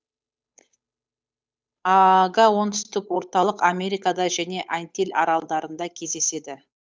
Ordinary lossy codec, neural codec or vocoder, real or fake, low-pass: none; codec, 16 kHz, 8 kbps, FunCodec, trained on Chinese and English, 25 frames a second; fake; none